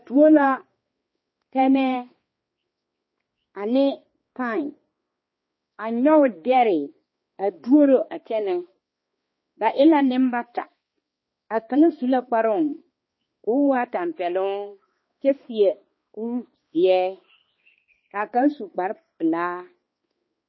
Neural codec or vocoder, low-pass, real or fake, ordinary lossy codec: codec, 16 kHz, 2 kbps, X-Codec, HuBERT features, trained on balanced general audio; 7.2 kHz; fake; MP3, 24 kbps